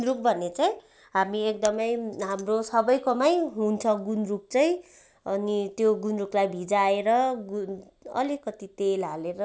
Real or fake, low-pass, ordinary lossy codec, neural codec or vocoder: real; none; none; none